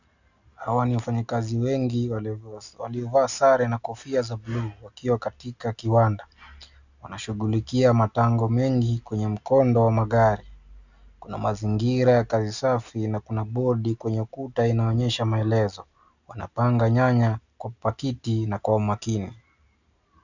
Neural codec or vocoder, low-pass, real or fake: none; 7.2 kHz; real